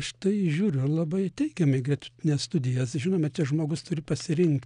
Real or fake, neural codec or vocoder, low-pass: real; none; 9.9 kHz